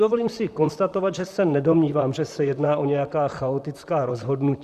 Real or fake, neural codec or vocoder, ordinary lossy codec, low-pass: fake; vocoder, 44.1 kHz, 128 mel bands, Pupu-Vocoder; Opus, 64 kbps; 14.4 kHz